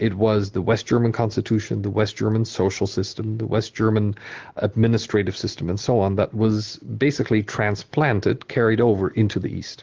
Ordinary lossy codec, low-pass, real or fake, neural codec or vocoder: Opus, 16 kbps; 7.2 kHz; real; none